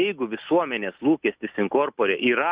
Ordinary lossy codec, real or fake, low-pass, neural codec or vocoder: Opus, 64 kbps; real; 3.6 kHz; none